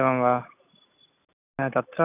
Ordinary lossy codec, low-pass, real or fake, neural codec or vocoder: none; 3.6 kHz; real; none